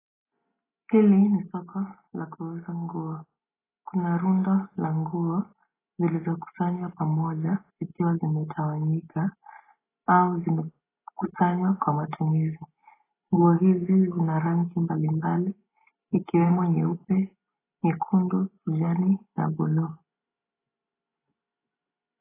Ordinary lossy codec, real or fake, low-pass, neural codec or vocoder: AAC, 16 kbps; real; 3.6 kHz; none